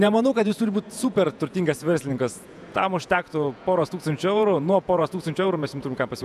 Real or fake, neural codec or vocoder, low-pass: fake; vocoder, 48 kHz, 128 mel bands, Vocos; 14.4 kHz